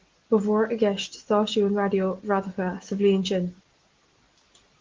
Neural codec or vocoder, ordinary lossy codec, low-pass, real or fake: none; Opus, 16 kbps; 7.2 kHz; real